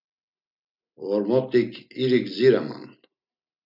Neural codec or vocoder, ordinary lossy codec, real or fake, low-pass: none; MP3, 48 kbps; real; 5.4 kHz